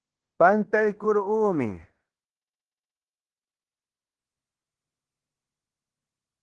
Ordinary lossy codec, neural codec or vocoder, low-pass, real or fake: Opus, 16 kbps; codec, 16 kHz in and 24 kHz out, 0.9 kbps, LongCat-Audio-Codec, fine tuned four codebook decoder; 10.8 kHz; fake